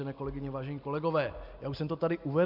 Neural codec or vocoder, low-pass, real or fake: none; 5.4 kHz; real